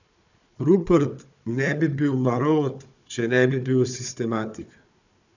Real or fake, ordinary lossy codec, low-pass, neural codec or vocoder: fake; none; 7.2 kHz; codec, 16 kHz, 4 kbps, FunCodec, trained on Chinese and English, 50 frames a second